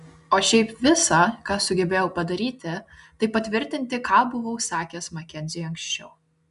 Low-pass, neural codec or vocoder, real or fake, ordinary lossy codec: 10.8 kHz; none; real; MP3, 96 kbps